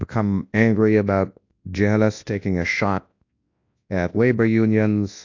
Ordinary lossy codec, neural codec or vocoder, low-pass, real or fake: AAC, 48 kbps; codec, 24 kHz, 0.9 kbps, WavTokenizer, large speech release; 7.2 kHz; fake